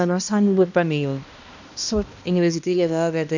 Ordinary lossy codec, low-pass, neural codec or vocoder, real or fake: none; 7.2 kHz; codec, 16 kHz, 1 kbps, X-Codec, HuBERT features, trained on balanced general audio; fake